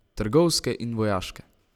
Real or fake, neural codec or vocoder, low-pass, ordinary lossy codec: real; none; 19.8 kHz; none